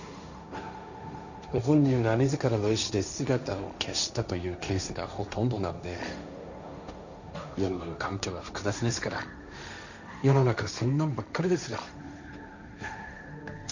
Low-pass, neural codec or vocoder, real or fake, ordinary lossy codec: 7.2 kHz; codec, 16 kHz, 1.1 kbps, Voila-Tokenizer; fake; none